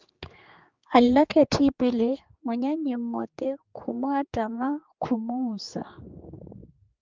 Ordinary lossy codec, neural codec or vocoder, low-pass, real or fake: Opus, 32 kbps; codec, 16 kHz, 4 kbps, X-Codec, HuBERT features, trained on general audio; 7.2 kHz; fake